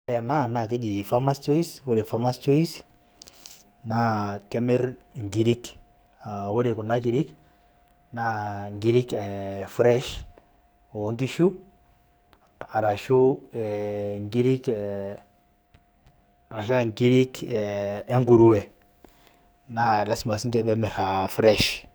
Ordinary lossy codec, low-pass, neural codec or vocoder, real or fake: none; none; codec, 44.1 kHz, 2.6 kbps, SNAC; fake